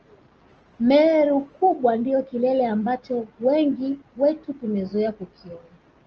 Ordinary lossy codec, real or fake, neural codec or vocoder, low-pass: Opus, 24 kbps; real; none; 7.2 kHz